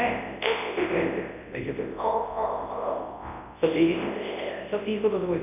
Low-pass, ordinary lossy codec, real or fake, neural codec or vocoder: 3.6 kHz; none; fake; codec, 24 kHz, 0.9 kbps, WavTokenizer, large speech release